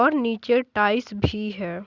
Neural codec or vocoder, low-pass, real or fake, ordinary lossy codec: none; 7.2 kHz; real; Opus, 64 kbps